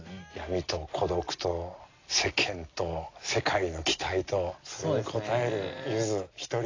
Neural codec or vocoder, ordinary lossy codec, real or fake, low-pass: none; AAC, 32 kbps; real; 7.2 kHz